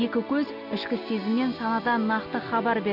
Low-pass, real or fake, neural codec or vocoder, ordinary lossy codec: 5.4 kHz; real; none; none